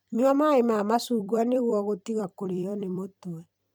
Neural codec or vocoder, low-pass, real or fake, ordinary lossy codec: vocoder, 44.1 kHz, 128 mel bands every 256 samples, BigVGAN v2; none; fake; none